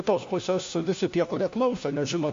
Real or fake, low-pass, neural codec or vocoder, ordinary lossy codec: fake; 7.2 kHz; codec, 16 kHz, 1 kbps, FunCodec, trained on LibriTTS, 50 frames a second; MP3, 48 kbps